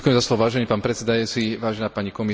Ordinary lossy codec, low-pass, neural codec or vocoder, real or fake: none; none; none; real